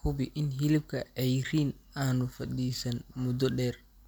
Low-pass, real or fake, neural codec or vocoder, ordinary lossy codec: none; real; none; none